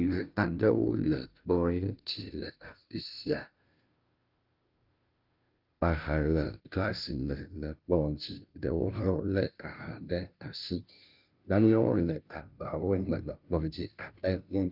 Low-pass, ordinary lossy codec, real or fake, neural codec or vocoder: 5.4 kHz; Opus, 24 kbps; fake; codec, 16 kHz, 0.5 kbps, FunCodec, trained on Chinese and English, 25 frames a second